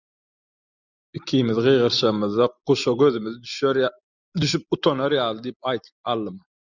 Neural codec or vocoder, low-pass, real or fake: none; 7.2 kHz; real